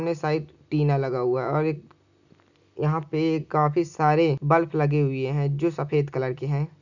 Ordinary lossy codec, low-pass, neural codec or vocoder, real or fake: none; 7.2 kHz; none; real